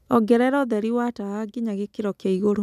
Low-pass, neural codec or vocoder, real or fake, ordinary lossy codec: 14.4 kHz; none; real; none